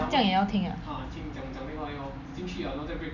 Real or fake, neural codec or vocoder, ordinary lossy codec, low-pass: real; none; none; 7.2 kHz